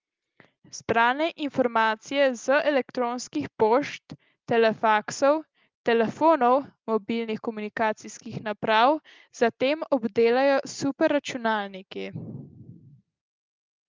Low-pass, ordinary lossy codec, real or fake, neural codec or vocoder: 7.2 kHz; Opus, 32 kbps; real; none